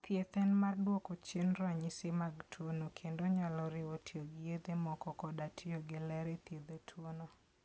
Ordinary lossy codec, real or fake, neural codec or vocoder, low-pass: none; real; none; none